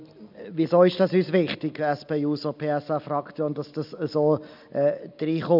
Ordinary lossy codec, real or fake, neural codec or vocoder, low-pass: none; real; none; 5.4 kHz